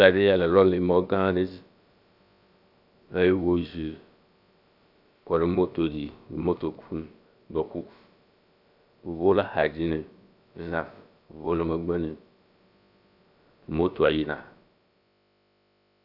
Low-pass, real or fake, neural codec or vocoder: 5.4 kHz; fake; codec, 16 kHz, about 1 kbps, DyCAST, with the encoder's durations